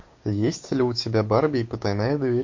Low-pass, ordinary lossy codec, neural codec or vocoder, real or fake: 7.2 kHz; MP3, 48 kbps; codec, 16 kHz, 6 kbps, DAC; fake